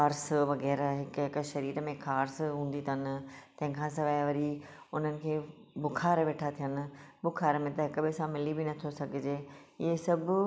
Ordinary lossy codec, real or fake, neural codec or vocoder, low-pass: none; real; none; none